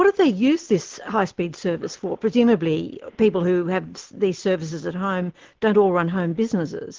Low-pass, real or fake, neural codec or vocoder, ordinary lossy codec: 7.2 kHz; real; none; Opus, 16 kbps